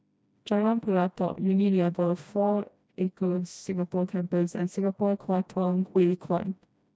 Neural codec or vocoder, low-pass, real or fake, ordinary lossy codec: codec, 16 kHz, 1 kbps, FreqCodec, smaller model; none; fake; none